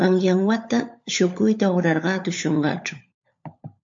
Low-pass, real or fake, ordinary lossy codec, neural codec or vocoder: 7.2 kHz; fake; MP3, 48 kbps; codec, 16 kHz, 16 kbps, FunCodec, trained on LibriTTS, 50 frames a second